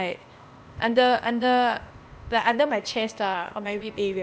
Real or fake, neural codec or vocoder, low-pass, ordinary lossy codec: fake; codec, 16 kHz, 0.8 kbps, ZipCodec; none; none